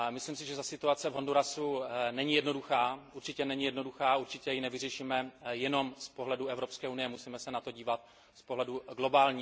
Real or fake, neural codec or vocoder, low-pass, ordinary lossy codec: real; none; none; none